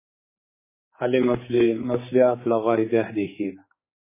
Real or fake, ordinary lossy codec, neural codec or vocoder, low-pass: fake; MP3, 16 kbps; codec, 16 kHz, 4 kbps, X-Codec, HuBERT features, trained on general audio; 3.6 kHz